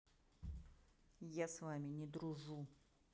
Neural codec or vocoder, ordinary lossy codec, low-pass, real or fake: none; none; none; real